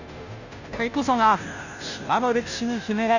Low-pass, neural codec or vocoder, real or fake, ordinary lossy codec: 7.2 kHz; codec, 16 kHz, 0.5 kbps, FunCodec, trained on Chinese and English, 25 frames a second; fake; none